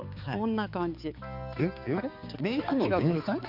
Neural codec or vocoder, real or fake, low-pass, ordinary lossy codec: codec, 16 kHz, 4 kbps, X-Codec, HuBERT features, trained on balanced general audio; fake; 5.4 kHz; none